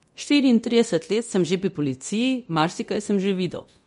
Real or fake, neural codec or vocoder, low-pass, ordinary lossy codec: fake; codec, 24 kHz, 0.9 kbps, DualCodec; 10.8 kHz; MP3, 48 kbps